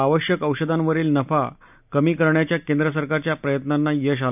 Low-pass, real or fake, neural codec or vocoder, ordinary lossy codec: 3.6 kHz; real; none; none